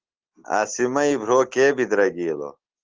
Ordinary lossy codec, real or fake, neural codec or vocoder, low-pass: Opus, 16 kbps; real; none; 7.2 kHz